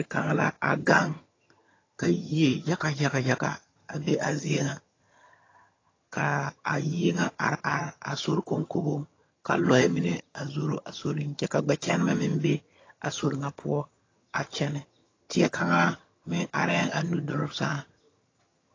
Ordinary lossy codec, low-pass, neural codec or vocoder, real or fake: AAC, 32 kbps; 7.2 kHz; vocoder, 22.05 kHz, 80 mel bands, HiFi-GAN; fake